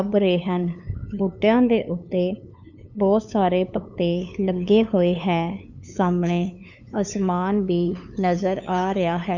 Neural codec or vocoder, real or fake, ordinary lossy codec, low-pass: codec, 16 kHz, 8 kbps, FunCodec, trained on LibriTTS, 25 frames a second; fake; none; 7.2 kHz